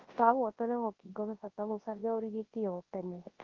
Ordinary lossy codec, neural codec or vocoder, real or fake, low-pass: Opus, 16 kbps; codec, 24 kHz, 0.9 kbps, WavTokenizer, large speech release; fake; 7.2 kHz